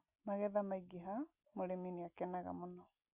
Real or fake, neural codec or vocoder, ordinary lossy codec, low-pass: real; none; Opus, 64 kbps; 3.6 kHz